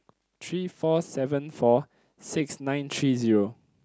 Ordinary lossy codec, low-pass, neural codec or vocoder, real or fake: none; none; none; real